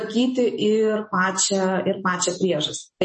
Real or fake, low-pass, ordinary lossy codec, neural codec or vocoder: real; 10.8 kHz; MP3, 32 kbps; none